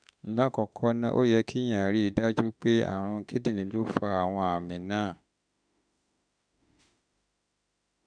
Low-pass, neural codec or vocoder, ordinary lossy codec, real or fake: 9.9 kHz; autoencoder, 48 kHz, 32 numbers a frame, DAC-VAE, trained on Japanese speech; none; fake